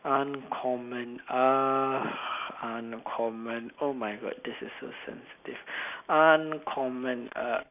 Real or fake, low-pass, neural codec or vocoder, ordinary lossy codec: real; 3.6 kHz; none; none